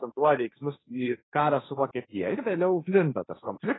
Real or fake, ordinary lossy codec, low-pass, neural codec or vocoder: fake; AAC, 16 kbps; 7.2 kHz; codec, 16 kHz, 1.1 kbps, Voila-Tokenizer